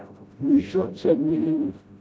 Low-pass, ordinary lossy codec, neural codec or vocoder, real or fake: none; none; codec, 16 kHz, 0.5 kbps, FreqCodec, smaller model; fake